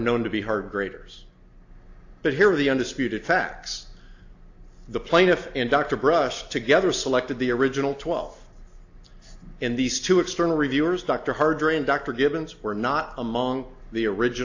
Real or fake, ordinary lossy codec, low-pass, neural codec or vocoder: real; AAC, 48 kbps; 7.2 kHz; none